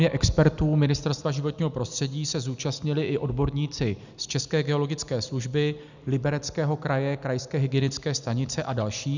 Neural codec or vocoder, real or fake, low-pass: none; real; 7.2 kHz